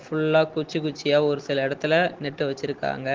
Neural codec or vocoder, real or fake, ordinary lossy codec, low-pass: none; real; Opus, 16 kbps; 7.2 kHz